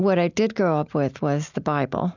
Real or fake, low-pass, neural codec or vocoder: real; 7.2 kHz; none